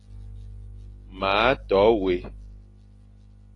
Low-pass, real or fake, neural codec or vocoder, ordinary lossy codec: 10.8 kHz; real; none; AAC, 32 kbps